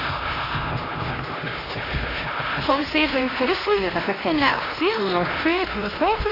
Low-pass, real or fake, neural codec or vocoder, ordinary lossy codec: 5.4 kHz; fake; codec, 16 kHz, 1 kbps, X-Codec, HuBERT features, trained on LibriSpeech; AAC, 24 kbps